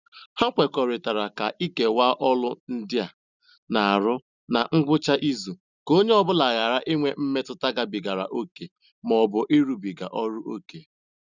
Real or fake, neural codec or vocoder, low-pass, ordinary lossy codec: real; none; 7.2 kHz; none